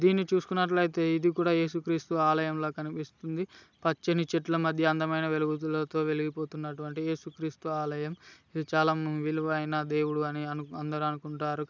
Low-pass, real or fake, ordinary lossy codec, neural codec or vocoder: 7.2 kHz; real; none; none